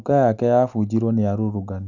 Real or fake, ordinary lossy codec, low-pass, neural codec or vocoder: real; none; 7.2 kHz; none